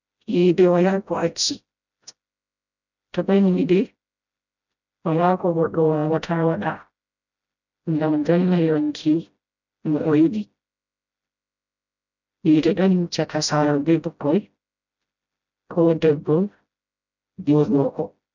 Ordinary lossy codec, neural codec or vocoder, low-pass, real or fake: none; codec, 16 kHz, 0.5 kbps, FreqCodec, smaller model; 7.2 kHz; fake